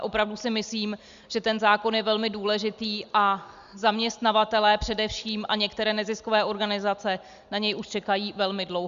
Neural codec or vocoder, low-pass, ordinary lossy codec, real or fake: none; 7.2 kHz; Opus, 64 kbps; real